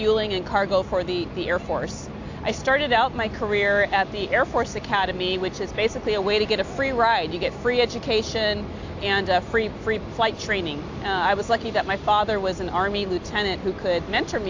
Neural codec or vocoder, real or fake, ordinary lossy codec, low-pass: none; real; AAC, 48 kbps; 7.2 kHz